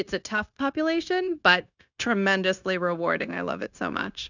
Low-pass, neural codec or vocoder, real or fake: 7.2 kHz; codec, 16 kHz, 0.9 kbps, LongCat-Audio-Codec; fake